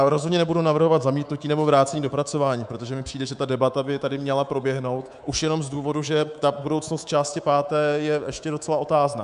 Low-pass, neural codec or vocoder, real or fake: 10.8 kHz; codec, 24 kHz, 3.1 kbps, DualCodec; fake